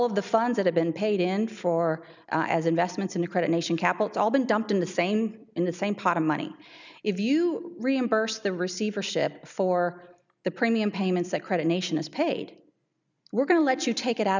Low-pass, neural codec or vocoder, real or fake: 7.2 kHz; none; real